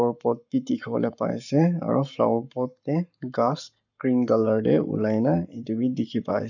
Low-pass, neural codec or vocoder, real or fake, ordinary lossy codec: 7.2 kHz; codec, 44.1 kHz, 7.8 kbps, Pupu-Codec; fake; none